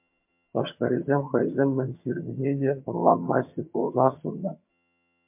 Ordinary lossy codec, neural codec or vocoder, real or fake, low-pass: MP3, 32 kbps; vocoder, 22.05 kHz, 80 mel bands, HiFi-GAN; fake; 3.6 kHz